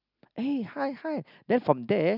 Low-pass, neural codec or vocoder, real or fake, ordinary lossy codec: 5.4 kHz; none; real; none